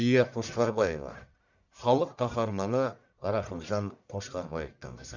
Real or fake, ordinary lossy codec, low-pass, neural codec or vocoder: fake; none; 7.2 kHz; codec, 44.1 kHz, 1.7 kbps, Pupu-Codec